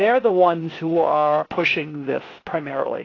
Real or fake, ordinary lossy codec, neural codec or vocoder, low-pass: fake; AAC, 32 kbps; codec, 16 kHz, 0.8 kbps, ZipCodec; 7.2 kHz